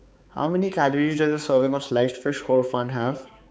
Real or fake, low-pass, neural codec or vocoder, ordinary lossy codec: fake; none; codec, 16 kHz, 4 kbps, X-Codec, HuBERT features, trained on balanced general audio; none